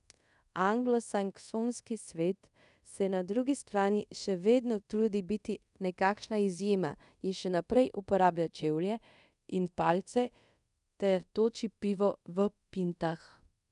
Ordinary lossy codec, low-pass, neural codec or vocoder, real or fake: none; 10.8 kHz; codec, 24 kHz, 0.5 kbps, DualCodec; fake